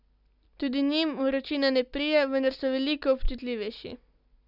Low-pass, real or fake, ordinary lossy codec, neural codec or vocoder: 5.4 kHz; real; none; none